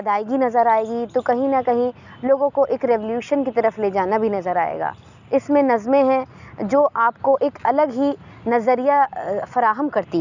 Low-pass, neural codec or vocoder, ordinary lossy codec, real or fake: 7.2 kHz; none; none; real